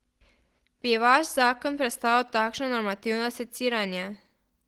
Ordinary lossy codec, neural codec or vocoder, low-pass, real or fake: Opus, 16 kbps; none; 19.8 kHz; real